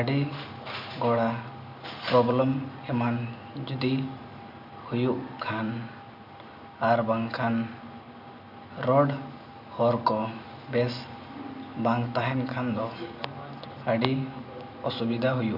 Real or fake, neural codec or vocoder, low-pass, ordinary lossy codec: real; none; 5.4 kHz; MP3, 48 kbps